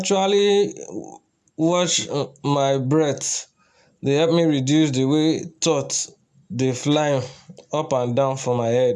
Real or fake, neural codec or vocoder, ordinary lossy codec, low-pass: real; none; none; none